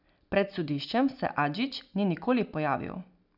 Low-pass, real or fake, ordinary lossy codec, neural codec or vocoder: 5.4 kHz; real; none; none